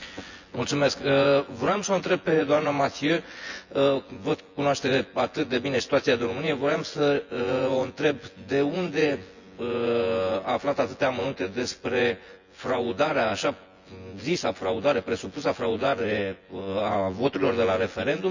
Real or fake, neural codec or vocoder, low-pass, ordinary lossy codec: fake; vocoder, 24 kHz, 100 mel bands, Vocos; 7.2 kHz; Opus, 64 kbps